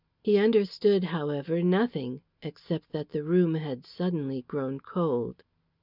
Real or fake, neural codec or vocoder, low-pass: real; none; 5.4 kHz